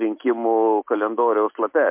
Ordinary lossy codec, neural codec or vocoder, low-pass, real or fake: MP3, 32 kbps; none; 3.6 kHz; real